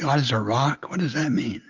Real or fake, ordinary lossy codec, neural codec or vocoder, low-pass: real; Opus, 24 kbps; none; 7.2 kHz